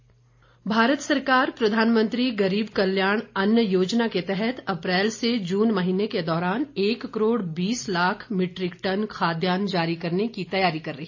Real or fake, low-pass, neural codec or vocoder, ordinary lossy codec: real; 7.2 kHz; none; AAC, 48 kbps